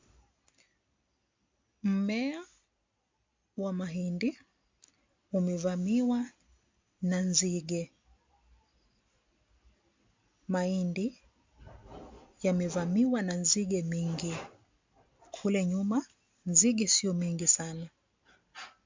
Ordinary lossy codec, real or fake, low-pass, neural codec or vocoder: MP3, 64 kbps; real; 7.2 kHz; none